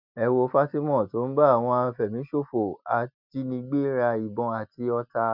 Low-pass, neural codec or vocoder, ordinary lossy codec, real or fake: 5.4 kHz; none; none; real